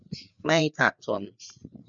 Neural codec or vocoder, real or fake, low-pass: codec, 16 kHz, 4 kbps, FreqCodec, larger model; fake; 7.2 kHz